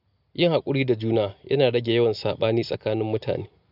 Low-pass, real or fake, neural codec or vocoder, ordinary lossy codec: 5.4 kHz; real; none; none